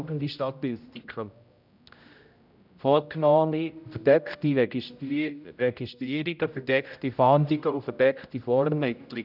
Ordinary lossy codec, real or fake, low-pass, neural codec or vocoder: none; fake; 5.4 kHz; codec, 16 kHz, 0.5 kbps, X-Codec, HuBERT features, trained on general audio